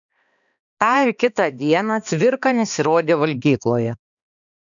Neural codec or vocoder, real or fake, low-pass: codec, 16 kHz, 2 kbps, X-Codec, HuBERT features, trained on balanced general audio; fake; 7.2 kHz